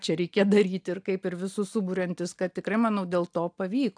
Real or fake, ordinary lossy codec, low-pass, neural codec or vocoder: real; AAC, 64 kbps; 9.9 kHz; none